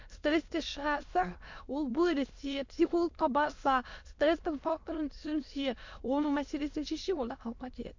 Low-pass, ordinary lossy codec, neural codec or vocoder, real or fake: 7.2 kHz; MP3, 48 kbps; autoencoder, 22.05 kHz, a latent of 192 numbers a frame, VITS, trained on many speakers; fake